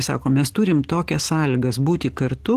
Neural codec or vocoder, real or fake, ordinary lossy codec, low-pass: codec, 44.1 kHz, 7.8 kbps, DAC; fake; Opus, 16 kbps; 14.4 kHz